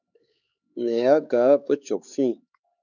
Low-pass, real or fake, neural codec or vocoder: 7.2 kHz; fake; codec, 16 kHz, 4 kbps, X-Codec, HuBERT features, trained on LibriSpeech